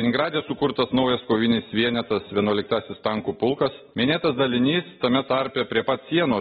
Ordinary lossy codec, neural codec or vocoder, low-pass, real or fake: AAC, 16 kbps; none; 7.2 kHz; real